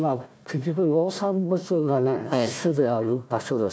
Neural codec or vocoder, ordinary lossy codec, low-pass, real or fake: codec, 16 kHz, 1 kbps, FunCodec, trained on Chinese and English, 50 frames a second; none; none; fake